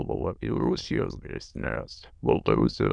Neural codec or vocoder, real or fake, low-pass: autoencoder, 22.05 kHz, a latent of 192 numbers a frame, VITS, trained on many speakers; fake; 9.9 kHz